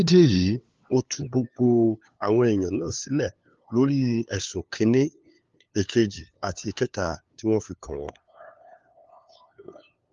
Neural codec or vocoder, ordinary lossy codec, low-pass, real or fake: codec, 16 kHz, 2 kbps, FunCodec, trained on LibriTTS, 25 frames a second; Opus, 32 kbps; 7.2 kHz; fake